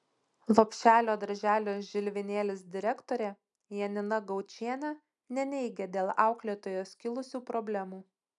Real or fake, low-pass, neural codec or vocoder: real; 10.8 kHz; none